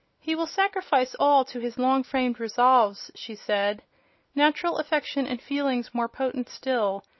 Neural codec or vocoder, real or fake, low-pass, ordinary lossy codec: none; real; 7.2 kHz; MP3, 24 kbps